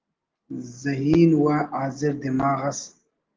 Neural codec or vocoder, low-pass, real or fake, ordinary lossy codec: none; 7.2 kHz; real; Opus, 16 kbps